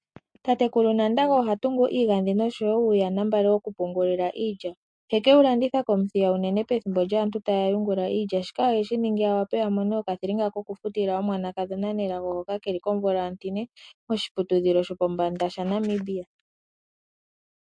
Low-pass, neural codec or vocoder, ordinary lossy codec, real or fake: 9.9 kHz; none; MP3, 48 kbps; real